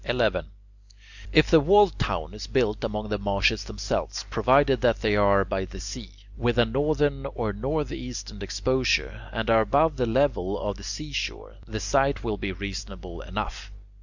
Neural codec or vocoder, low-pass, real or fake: none; 7.2 kHz; real